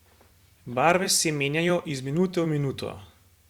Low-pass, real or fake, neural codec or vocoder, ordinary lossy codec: 19.8 kHz; fake; vocoder, 44.1 kHz, 128 mel bands every 256 samples, BigVGAN v2; Opus, 64 kbps